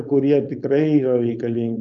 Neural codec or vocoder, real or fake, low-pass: codec, 16 kHz, 4.8 kbps, FACodec; fake; 7.2 kHz